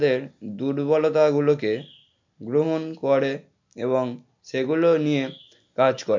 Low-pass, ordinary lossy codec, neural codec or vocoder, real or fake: 7.2 kHz; MP3, 48 kbps; none; real